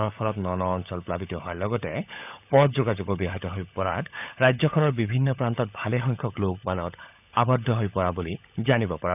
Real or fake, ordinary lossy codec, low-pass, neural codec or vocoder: fake; none; 3.6 kHz; codec, 16 kHz, 16 kbps, FunCodec, trained on LibriTTS, 50 frames a second